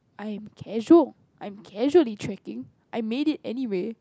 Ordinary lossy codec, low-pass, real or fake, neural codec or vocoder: none; none; real; none